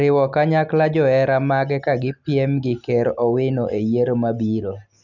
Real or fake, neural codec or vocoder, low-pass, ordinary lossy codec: real; none; 7.2 kHz; none